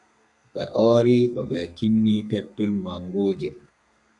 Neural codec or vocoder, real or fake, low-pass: codec, 32 kHz, 1.9 kbps, SNAC; fake; 10.8 kHz